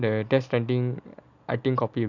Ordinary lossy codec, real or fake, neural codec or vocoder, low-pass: none; real; none; 7.2 kHz